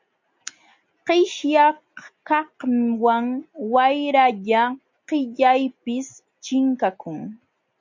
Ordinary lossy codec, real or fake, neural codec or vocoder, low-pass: MP3, 64 kbps; real; none; 7.2 kHz